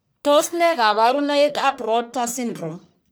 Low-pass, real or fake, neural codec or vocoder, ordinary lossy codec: none; fake; codec, 44.1 kHz, 1.7 kbps, Pupu-Codec; none